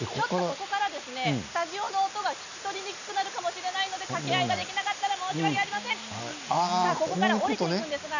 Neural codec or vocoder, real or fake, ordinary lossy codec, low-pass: none; real; none; 7.2 kHz